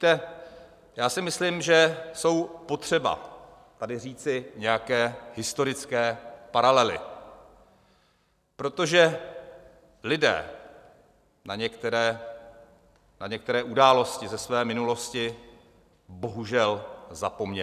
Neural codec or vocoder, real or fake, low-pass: none; real; 14.4 kHz